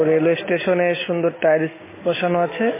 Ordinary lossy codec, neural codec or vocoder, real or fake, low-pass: MP3, 16 kbps; none; real; 3.6 kHz